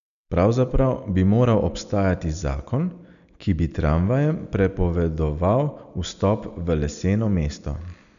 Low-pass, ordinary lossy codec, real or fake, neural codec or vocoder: 7.2 kHz; none; real; none